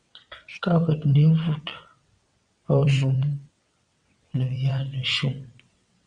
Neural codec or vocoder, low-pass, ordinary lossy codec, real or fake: vocoder, 22.05 kHz, 80 mel bands, WaveNeXt; 9.9 kHz; Opus, 64 kbps; fake